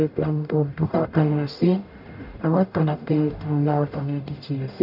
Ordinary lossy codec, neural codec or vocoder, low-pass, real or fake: none; codec, 44.1 kHz, 0.9 kbps, DAC; 5.4 kHz; fake